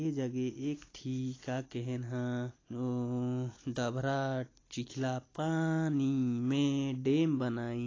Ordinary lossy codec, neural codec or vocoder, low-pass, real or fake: AAC, 32 kbps; none; 7.2 kHz; real